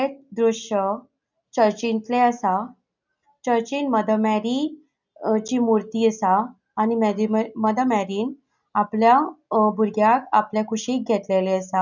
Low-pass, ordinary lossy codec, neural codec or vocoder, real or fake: 7.2 kHz; none; none; real